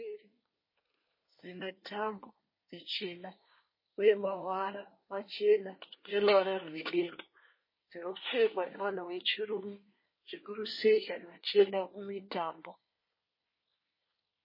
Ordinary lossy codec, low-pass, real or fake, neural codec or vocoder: MP3, 24 kbps; 5.4 kHz; fake; codec, 24 kHz, 1 kbps, SNAC